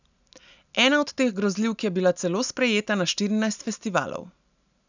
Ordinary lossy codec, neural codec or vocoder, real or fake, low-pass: none; none; real; 7.2 kHz